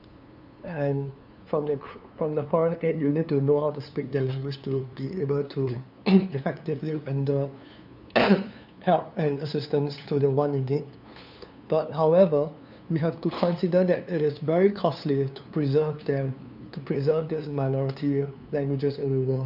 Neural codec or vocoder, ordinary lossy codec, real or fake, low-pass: codec, 16 kHz, 2 kbps, FunCodec, trained on LibriTTS, 25 frames a second; none; fake; 5.4 kHz